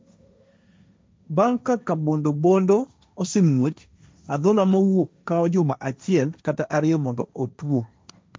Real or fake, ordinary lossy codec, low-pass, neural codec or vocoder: fake; none; none; codec, 16 kHz, 1.1 kbps, Voila-Tokenizer